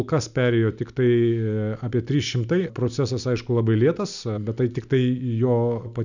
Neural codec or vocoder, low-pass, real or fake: none; 7.2 kHz; real